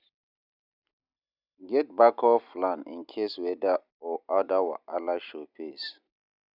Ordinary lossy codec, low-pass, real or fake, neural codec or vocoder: none; 5.4 kHz; real; none